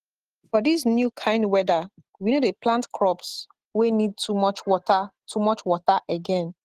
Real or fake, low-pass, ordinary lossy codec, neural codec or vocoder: real; 14.4 kHz; Opus, 16 kbps; none